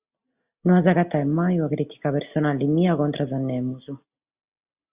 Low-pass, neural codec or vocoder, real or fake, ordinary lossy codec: 3.6 kHz; none; real; Opus, 64 kbps